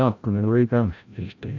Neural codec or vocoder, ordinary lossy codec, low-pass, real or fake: codec, 16 kHz, 0.5 kbps, FreqCodec, larger model; AAC, 48 kbps; 7.2 kHz; fake